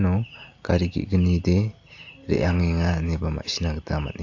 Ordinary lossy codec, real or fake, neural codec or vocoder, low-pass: AAC, 48 kbps; real; none; 7.2 kHz